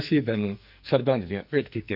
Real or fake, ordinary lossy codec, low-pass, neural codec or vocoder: fake; none; 5.4 kHz; codec, 44.1 kHz, 2.6 kbps, SNAC